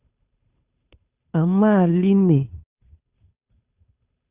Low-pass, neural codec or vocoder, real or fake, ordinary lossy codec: 3.6 kHz; codec, 16 kHz, 2 kbps, FunCodec, trained on Chinese and English, 25 frames a second; fake; Opus, 64 kbps